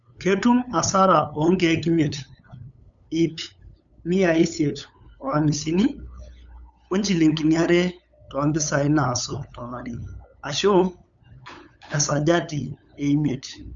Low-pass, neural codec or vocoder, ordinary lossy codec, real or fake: 7.2 kHz; codec, 16 kHz, 8 kbps, FunCodec, trained on LibriTTS, 25 frames a second; none; fake